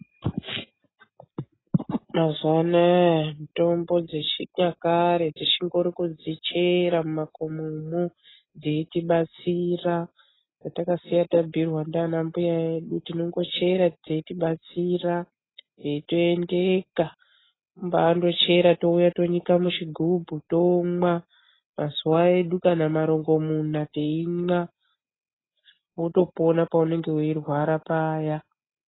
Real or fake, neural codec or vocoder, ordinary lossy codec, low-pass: real; none; AAC, 16 kbps; 7.2 kHz